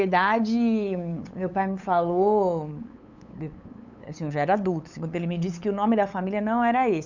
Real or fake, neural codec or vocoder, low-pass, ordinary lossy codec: fake; codec, 16 kHz, 8 kbps, FunCodec, trained on LibriTTS, 25 frames a second; 7.2 kHz; none